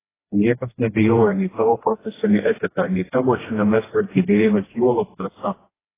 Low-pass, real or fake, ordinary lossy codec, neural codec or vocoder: 3.6 kHz; fake; AAC, 16 kbps; codec, 16 kHz, 1 kbps, FreqCodec, smaller model